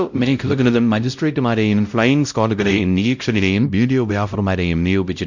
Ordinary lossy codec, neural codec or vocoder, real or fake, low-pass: none; codec, 16 kHz, 0.5 kbps, X-Codec, WavLM features, trained on Multilingual LibriSpeech; fake; 7.2 kHz